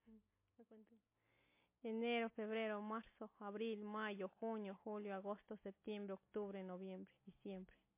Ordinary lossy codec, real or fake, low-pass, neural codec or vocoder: MP3, 24 kbps; real; 3.6 kHz; none